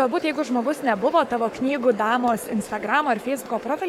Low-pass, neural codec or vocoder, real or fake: 19.8 kHz; codec, 44.1 kHz, 7.8 kbps, Pupu-Codec; fake